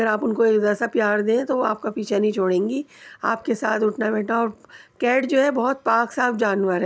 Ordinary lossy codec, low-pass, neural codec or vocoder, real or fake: none; none; none; real